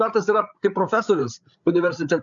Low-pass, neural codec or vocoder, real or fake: 7.2 kHz; codec, 16 kHz, 8 kbps, FreqCodec, larger model; fake